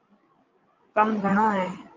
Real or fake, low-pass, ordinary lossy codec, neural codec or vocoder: fake; 7.2 kHz; Opus, 24 kbps; codec, 16 kHz, 16 kbps, FreqCodec, larger model